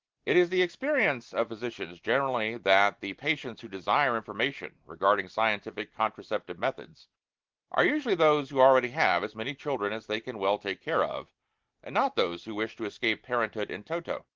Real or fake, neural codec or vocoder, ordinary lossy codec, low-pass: real; none; Opus, 16 kbps; 7.2 kHz